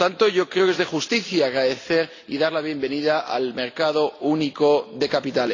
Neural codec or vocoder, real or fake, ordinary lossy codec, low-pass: none; real; AAC, 32 kbps; 7.2 kHz